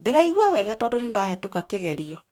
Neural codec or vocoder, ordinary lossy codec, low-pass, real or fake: codec, 44.1 kHz, 2.6 kbps, DAC; MP3, 96 kbps; 19.8 kHz; fake